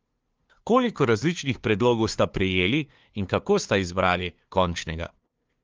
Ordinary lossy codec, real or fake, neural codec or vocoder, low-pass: Opus, 24 kbps; fake; codec, 16 kHz, 2 kbps, FunCodec, trained on LibriTTS, 25 frames a second; 7.2 kHz